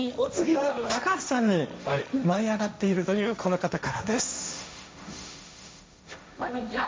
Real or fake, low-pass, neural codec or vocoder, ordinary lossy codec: fake; none; codec, 16 kHz, 1.1 kbps, Voila-Tokenizer; none